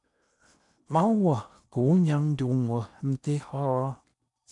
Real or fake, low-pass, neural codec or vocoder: fake; 10.8 kHz; codec, 16 kHz in and 24 kHz out, 0.8 kbps, FocalCodec, streaming, 65536 codes